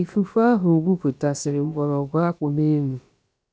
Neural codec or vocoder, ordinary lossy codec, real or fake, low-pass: codec, 16 kHz, about 1 kbps, DyCAST, with the encoder's durations; none; fake; none